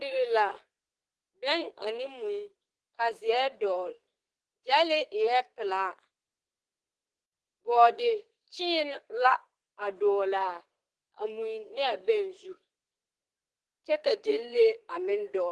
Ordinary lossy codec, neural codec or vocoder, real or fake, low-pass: Opus, 16 kbps; codec, 32 kHz, 1.9 kbps, SNAC; fake; 10.8 kHz